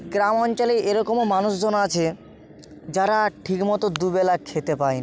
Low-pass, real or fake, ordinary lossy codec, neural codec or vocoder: none; real; none; none